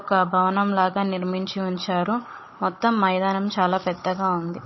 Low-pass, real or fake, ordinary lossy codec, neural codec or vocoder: 7.2 kHz; fake; MP3, 24 kbps; codec, 16 kHz, 16 kbps, FunCodec, trained on Chinese and English, 50 frames a second